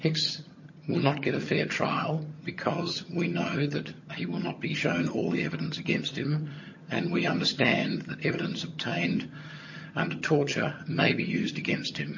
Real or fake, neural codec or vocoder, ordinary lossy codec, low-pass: fake; vocoder, 22.05 kHz, 80 mel bands, HiFi-GAN; MP3, 32 kbps; 7.2 kHz